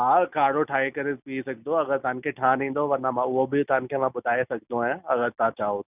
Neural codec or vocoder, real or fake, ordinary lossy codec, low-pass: none; real; none; 3.6 kHz